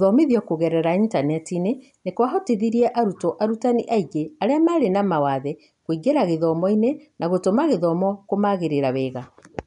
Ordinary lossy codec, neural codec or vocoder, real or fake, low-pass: none; none; real; 10.8 kHz